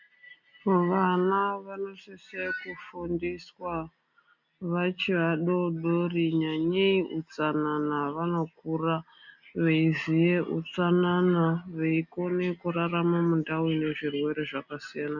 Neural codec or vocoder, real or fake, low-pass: none; real; 7.2 kHz